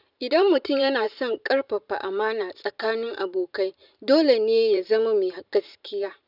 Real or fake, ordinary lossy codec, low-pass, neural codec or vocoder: fake; none; 5.4 kHz; vocoder, 44.1 kHz, 128 mel bands every 512 samples, BigVGAN v2